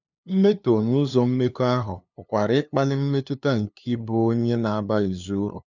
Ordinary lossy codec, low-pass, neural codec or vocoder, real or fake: none; 7.2 kHz; codec, 16 kHz, 2 kbps, FunCodec, trained on LibriTTS, 25 frames a second; fake